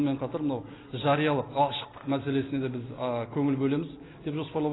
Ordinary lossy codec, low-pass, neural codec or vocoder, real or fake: AAC, 16 kbps; 7.2 kHz; none; real